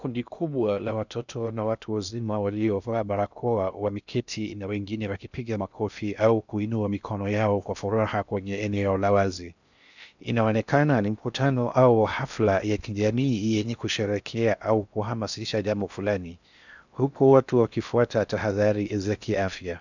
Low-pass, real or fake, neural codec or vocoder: 7.2 kHz; fake; codec, 16 kHz in and 24 kHz out, 0.6 kbps, FocalCodec, streaming, 2048 codes